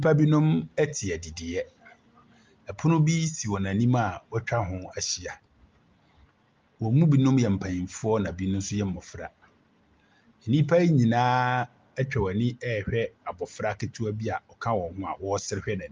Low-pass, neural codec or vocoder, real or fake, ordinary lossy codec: 7.2 kHz; none; real; Opus, 24 kbps